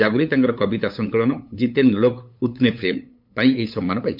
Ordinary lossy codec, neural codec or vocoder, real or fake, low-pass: MP3, 48 kbps; codec, 16 kHz, 8 kbps, FunCodec, trained on LibriTTS, 25 frames a second; fake; 5.4 kHz